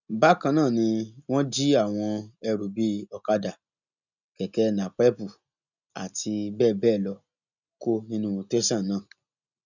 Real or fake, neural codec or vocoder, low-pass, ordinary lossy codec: real; none; 7.2 kHz; none